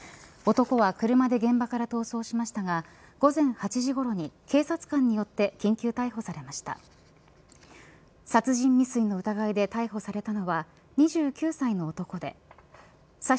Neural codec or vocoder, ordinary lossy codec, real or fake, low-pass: none; none; real; none